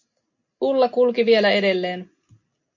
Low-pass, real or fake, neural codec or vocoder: 7.2 kHz; real; none